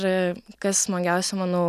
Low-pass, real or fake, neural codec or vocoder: 14.4 kHz; real; none